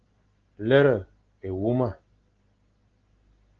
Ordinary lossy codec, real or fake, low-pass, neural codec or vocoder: Opus, 16 kbps; real; 7.2 kHz; none